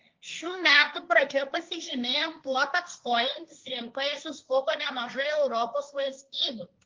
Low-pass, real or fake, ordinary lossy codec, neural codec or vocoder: 7.2 kHz; fake; Opus, 32 kbps; codec, 16 kHz, 1.1 kbps, Voila-Tokenizer